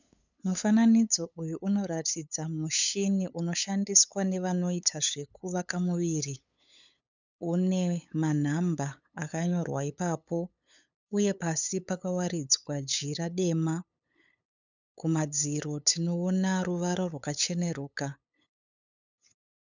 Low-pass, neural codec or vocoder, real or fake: 7.2 kHz; codec, 16 kHz, 8 kbps, FunCodec, trained on LibriTTS, 25 frames a second; fake